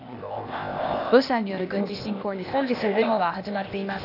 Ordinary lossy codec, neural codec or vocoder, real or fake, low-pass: none; codec, 16 kHz, 0.8 kbps, ZipCodec; fake; 5.4 kHz